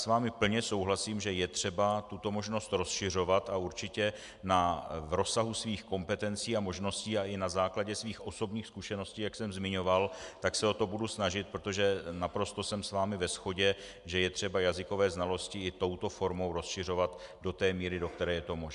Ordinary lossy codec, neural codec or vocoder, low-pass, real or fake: MP3, 96 kbps; none; 10.8 kHz; real